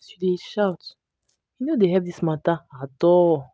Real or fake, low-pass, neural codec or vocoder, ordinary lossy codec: real; none; none; none